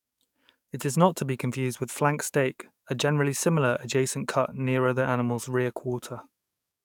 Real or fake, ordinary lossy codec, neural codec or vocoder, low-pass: fake; none; codec, 44.1 kHz, 7.8 kbps, DAC; 19.8 kHz